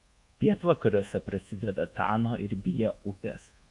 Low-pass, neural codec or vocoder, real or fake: 10.8 kHz; codec, 24 kHz, 1.2 kbps, DualCodec; fake